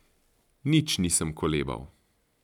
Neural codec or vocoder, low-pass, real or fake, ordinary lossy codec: none; 19.8 kHz; real; none